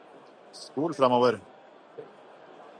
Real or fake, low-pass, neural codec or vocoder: real; 9.9 kHz; none